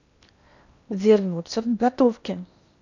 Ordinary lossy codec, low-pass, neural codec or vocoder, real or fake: none; 7.2 kHz; codec, 16 kHz in and 24 kHz out, 0.8 kbps, FocalCodec, streaming, 65536 codes; fake